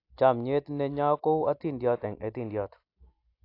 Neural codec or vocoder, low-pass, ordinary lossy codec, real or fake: none; 5.4 kHz; AAC, 32 kbps; real